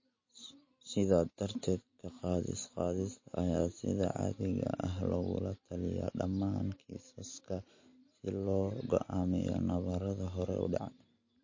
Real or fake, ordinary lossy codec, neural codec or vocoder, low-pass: real; MP3, 32 kbps; none; 7.2 kHz